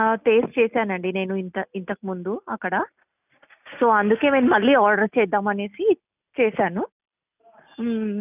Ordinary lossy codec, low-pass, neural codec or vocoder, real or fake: none; 3.6 kHz; none; real